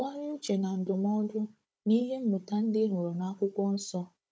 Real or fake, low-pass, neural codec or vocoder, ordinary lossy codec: fake; none; codec, 16 kHz, 16 kbps, FunCodec, trained on Chinese and English, 50 frames a second; none